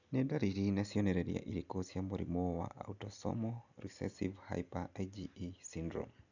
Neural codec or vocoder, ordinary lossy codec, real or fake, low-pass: none; none; real; 7.2 kHz